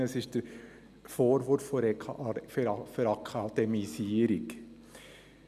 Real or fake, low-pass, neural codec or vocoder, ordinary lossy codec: real; 14.4 kHz; none; none